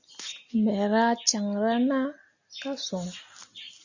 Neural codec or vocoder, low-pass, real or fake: none; 7.2 kHz; real